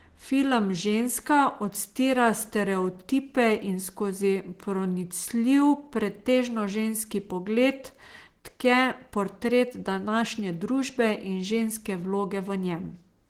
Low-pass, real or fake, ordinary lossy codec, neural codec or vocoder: 19.8 kHz; real; Opus, 16 kbps; none